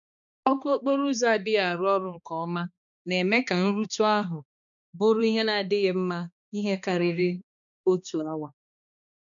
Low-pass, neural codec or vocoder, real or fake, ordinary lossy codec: 7.2 kHz; codec, 16 kHz, 2 kbps, X-Codec, HuBERT features, trained on balanced general audio; fake; none